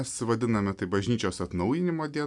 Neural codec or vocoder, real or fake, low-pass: none; real; 10.8 kHz